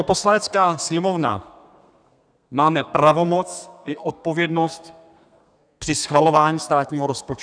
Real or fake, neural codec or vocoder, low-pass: fake; codec, 32 kHz, 1.9 kbps, SNAC; 9.9 kHz